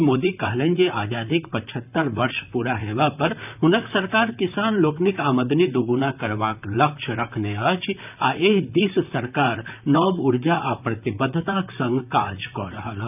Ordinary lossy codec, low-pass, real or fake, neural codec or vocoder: none; 3.6 kHz; fake; vocoder, 44.1 kHz, 128 mel bands, Pupu-Vocoder